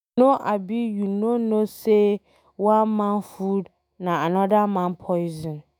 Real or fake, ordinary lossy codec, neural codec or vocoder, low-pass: fake; none; autoencoder, 48 kHz, 128 numbers a frame, DAC-VAE, trained on Japanese speech; none